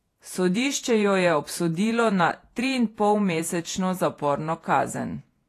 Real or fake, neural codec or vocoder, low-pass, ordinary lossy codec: fake; vocoder, 48 kHz, 128 mel bands, Vocos; 14.4 kHz; AAC, 48 kbps